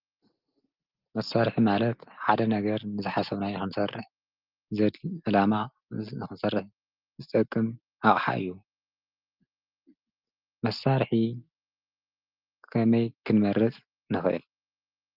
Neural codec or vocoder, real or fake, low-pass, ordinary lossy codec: none; real; 5.4 kHz; Opus, 24 kbps